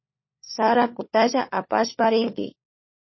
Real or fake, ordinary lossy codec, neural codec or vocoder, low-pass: fake; MP3, 24 kbps; codec, 16 kHz, 4 kbps, FunCodec, trained on LibriTTS, 50 frames a second; 7.2 kHz